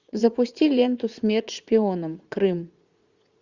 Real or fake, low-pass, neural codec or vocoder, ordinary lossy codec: fake; 7.2 kHz; vocoder, 44.1 kHz, 80 mel bands, Vocos; Opus, 64 kbps